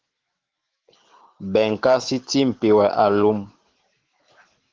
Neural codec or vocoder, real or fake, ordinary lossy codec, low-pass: autoencoder, 48 kHz, 128 numbers a frame, DAC-VAE, trained on Japanese speech; fake; Opus, 16 kbps; 7.2 kHz